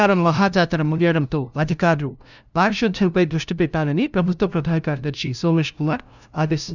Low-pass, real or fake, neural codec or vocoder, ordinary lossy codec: 7.2 kHz; fake; codec, 16 kHz, 0.5 kbps, FunCodec, trained on LibriTTS, 25 frames a second; none